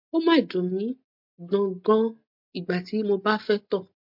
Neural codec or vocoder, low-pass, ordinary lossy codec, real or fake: none; 5.4 kHz; MP3, 48 kbps; real